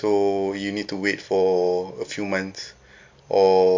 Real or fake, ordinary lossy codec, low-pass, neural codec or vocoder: real; MP3, 48 kbps; 7.2 kHz; none